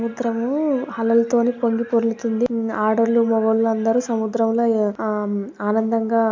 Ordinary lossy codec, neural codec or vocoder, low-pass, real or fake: MP3, 64 kbps; none; 7.2 kHz; real